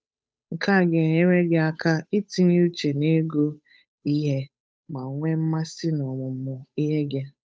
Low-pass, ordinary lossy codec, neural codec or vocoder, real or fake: none; none; codec, 16 kHz, 8 kbps, FunCodec, trained on Chinese and English, 25 frames a second; fake